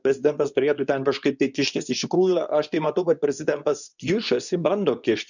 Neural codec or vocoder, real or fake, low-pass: codec, 24 kHz, 0.9 kbps, WavTokenizer, medium speech release version 2; fake; 7.2 kHz